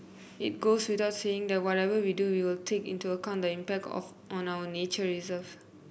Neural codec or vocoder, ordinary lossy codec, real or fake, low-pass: none; none; real; none